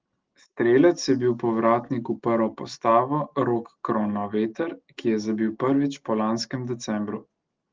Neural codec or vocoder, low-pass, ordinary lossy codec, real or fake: none; 7.2 kHz; Opus, 16 kbps; real